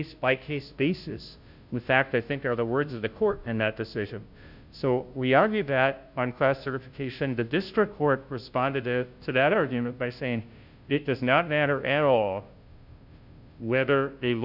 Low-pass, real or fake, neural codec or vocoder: 5.4 kHz; fake; codec, 16 kHz, 0.5 kbps, FunCodec, trained on Chinese and English, 25 frames a second